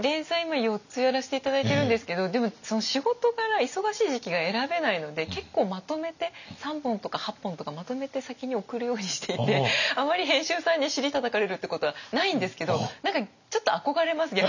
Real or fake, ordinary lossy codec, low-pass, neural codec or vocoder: real; none; 7.2 kHz; none